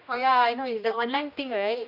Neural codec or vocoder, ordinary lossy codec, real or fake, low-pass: codec, 16 kHz, 1 kbps, X-Codec, HuBERT features, trained on general audio; none; fake; 5.4 kHz